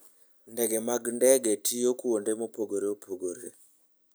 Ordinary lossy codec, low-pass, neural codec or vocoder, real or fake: none; none; none; real